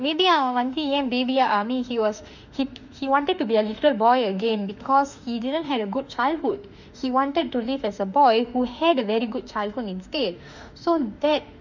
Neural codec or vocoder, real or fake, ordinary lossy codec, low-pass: autoencoder, 48 kHz, 32 numbers a frame, DAC-VAE, trained on Japanese speech; fake; none; 7.2 kHz